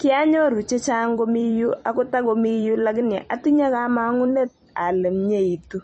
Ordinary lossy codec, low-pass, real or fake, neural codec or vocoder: MP3, 32 kbps; 10.8 kHz; fake; vocoder, 44.1 kHz, 128 mel bands every 256 samples, BigVGAN v2